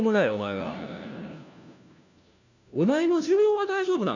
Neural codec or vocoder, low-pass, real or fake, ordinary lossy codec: codec, 16 kHz, 1 kbps, FunCodec, trained on LibriTTS, 50 frames a second; 7.2 kHz; fake; none